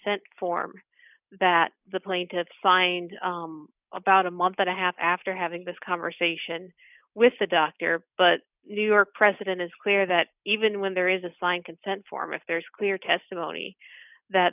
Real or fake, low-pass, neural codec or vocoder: real; 3.6 kHz; none